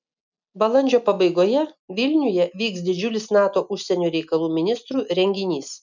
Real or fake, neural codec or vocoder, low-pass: real; none; 7.2 kHz